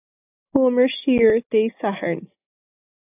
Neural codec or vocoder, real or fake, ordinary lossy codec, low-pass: none; real; AAC, 32 kbps; 3.6 kHz